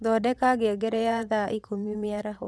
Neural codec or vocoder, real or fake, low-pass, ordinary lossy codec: vocoder, 22.05 kHz, 80 mel bands, WaveNeXt; fake; none; none